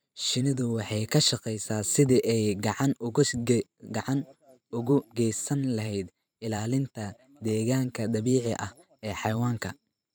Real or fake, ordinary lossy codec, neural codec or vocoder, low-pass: fake; none; vocoder, 44.1 kHz, 128 mel bands every 512 samples, BigVGAN v2; none